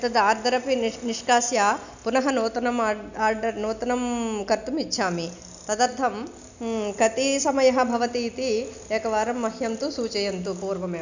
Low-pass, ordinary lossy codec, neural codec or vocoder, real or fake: 7.2 kHz; none; none; real